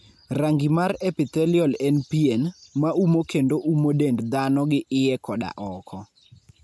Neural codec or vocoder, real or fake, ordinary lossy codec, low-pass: none; real; none; none